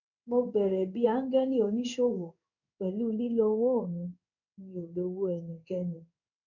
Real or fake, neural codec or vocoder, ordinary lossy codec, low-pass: fake; codec, 16 kHz in and 24 kHz out, 1 kbps, XY-Tokenizer; none; 7.2 kHz